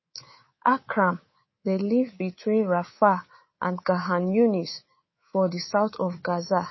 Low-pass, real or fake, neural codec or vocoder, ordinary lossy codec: 7.2 kHz; fake; codec, 24 kHz, 3.1 kbps, DualCodec; MP3, 24 kbps